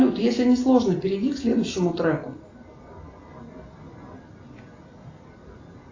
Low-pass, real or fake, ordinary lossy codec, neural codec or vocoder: 7.2 kHz; real; AAC, 32 kbps; none